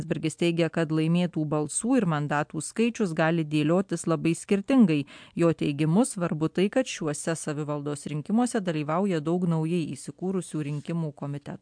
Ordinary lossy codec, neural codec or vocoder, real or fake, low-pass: MP3, 64 kbps; none; real; 9.9 kHz